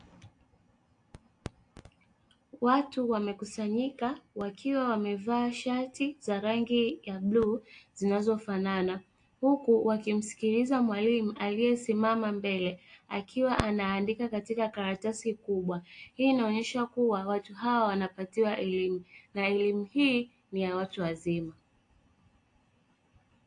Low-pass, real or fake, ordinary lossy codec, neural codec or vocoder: 9.9 kHz; real; AAC, 48 kbps; none